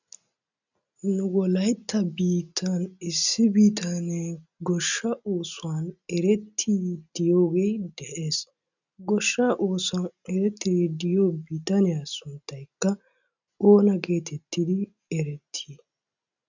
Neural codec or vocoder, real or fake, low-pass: none; real; 7.2 kHz